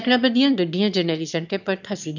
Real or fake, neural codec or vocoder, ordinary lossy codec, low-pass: fake; autoencoder, 22.05 kHz, a latent of 192 numbers a frame, VITS, trained on one speaker; none; 7.2 kHz